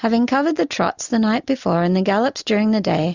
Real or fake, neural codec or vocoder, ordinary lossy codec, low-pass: real; none; Opus, 64 kbps; 7.2 kHz